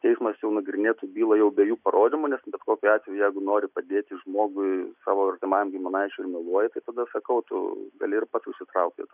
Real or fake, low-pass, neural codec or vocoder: real; 3.6 kHz; none